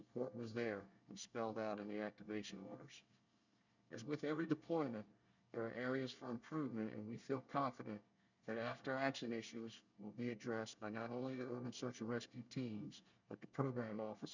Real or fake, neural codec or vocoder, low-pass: fake; codec, 24 kHz, 1 kbps, SNAC; 7.2 kHz